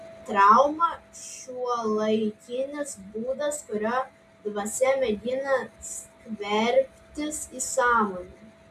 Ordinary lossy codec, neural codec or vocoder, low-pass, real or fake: AAC, 96 kbps; none; 14.4 kHz; real